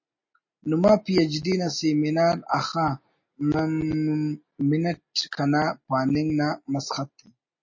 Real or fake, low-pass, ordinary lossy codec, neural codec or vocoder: real; 7.2 kHz; MP3, 32 kbps; none